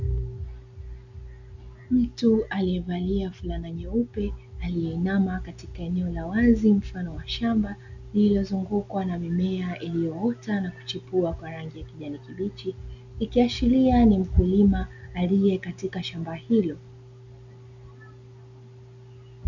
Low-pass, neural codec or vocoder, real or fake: 7.2 kHz; none; real